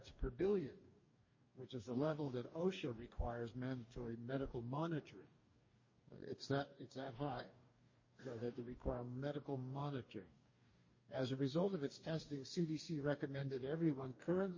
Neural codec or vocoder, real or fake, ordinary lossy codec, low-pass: codec, 44.1 kHz, 2.6 kbps, DAC; fake; MP3, 32 kbps; 7.2 kHz